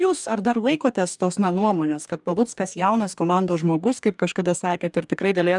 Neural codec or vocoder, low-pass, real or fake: codec, 44.1 kHz, 2.6 kbps, DAC; 10.8 kHz; fake